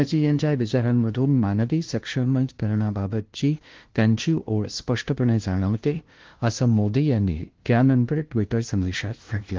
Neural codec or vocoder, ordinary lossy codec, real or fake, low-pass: codec, 16 kHz, 0.5 kbps, FunCodec, trained on LibriTTS, 25 frames a second; Opus, 16 kbps; fake; 7.2 kHz